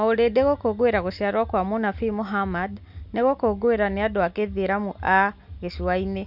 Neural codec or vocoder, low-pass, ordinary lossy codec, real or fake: none; 5.4 kHz; none; real